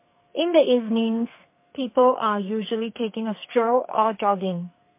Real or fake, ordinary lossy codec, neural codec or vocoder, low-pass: fake; MP3, 24 kbps; codec, 44.1 kHz, 2.6 kbps, SNAC; 3.6 kHz